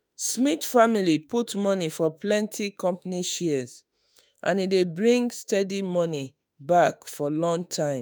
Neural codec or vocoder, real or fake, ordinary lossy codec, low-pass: autoencoder, 48 kHz, 32 numbers a frame, DAC-VAE, trained on Japanese speech; fake; none; none